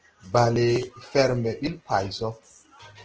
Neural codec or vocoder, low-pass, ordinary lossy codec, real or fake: none; 7.2 kHz; Opus, 16 kbps; real